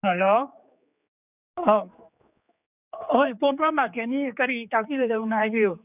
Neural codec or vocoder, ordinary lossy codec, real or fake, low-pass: codec, 16 kHz, 4 kbps, X-Codec, HuBERT features, trained on general audio; none; fake; 3.6 kHz